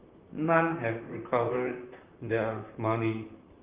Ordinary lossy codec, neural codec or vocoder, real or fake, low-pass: Opus, 24 kbps; vocoder, 44.1 kHz, 128 mel bands, Pupu-Vocoder; fake; 3.6 kHz